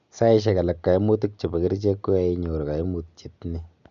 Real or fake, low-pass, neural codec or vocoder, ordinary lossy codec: real; 7.2 kHz; none; none